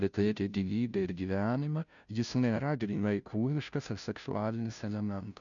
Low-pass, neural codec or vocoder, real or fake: 7.2 kHz; codec, 16 kHz, 0.5 kbps, FunCodec, trained on Chinese and English, 25 frames a second; fake